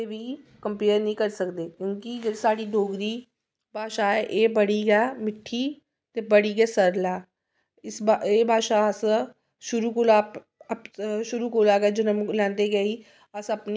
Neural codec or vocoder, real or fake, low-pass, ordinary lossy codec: none; real; none; none